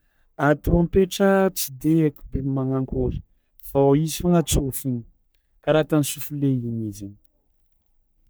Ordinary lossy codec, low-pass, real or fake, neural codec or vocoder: none; none; fake; codec, 44.1 kHz, 3.4 kbps, Pupu-Codec